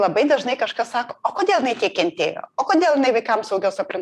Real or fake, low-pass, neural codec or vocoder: fake; 14.4 kHz; vocoder, 44.1 kHz, 128 mel bands every 256 samples, BigVGAN v2